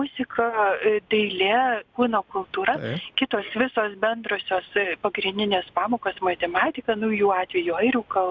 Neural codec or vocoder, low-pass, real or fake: none; 7.2 kHz; real